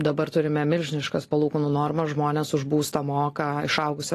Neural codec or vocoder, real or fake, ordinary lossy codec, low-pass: none; real; AAC, 48 kbps; 14.4 kHz